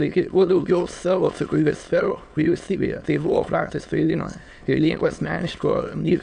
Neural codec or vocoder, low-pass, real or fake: autoencoder, 22.05 kHz, a latent of 192 numbers a frame, VITS, trained on many speakers; 9.9 kHz; fake